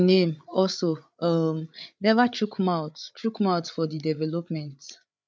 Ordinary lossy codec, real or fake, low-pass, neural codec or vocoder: none; fake; none; codec, 16 kHz, 8 kbps, FreqCodec, larger model